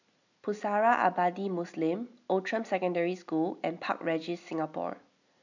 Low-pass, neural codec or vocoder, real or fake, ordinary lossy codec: 7.2 kHz; none; real; none